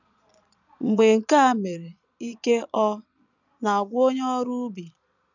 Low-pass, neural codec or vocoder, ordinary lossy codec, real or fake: 7.2 kHz; vocoder, 22.05 kHz, 80 mel bands, Vocos; none; fake